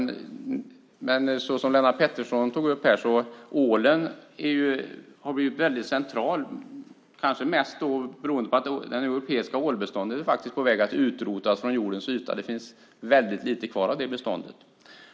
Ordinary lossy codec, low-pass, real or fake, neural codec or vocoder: none; none; real; none